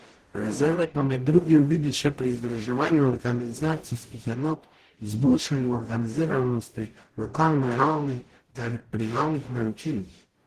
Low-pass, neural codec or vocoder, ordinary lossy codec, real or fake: 14.4 kHz; codec, 44.1 kHz, 0.9 kbps, DAC; Opus, 16 kbps; fake